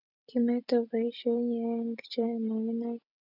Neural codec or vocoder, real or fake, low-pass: none; real; 5.4 kHz